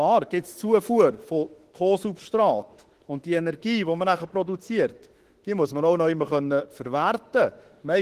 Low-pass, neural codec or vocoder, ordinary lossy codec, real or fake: 14.4 kHz; autoencoder, 48 kHz, 32 numbers a frame, DAC-VAE, trained on Japanese speech; Opus, 16 kbps; fake